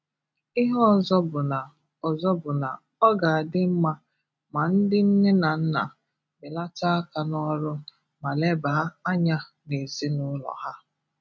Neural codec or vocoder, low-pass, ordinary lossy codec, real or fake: none; none; none; real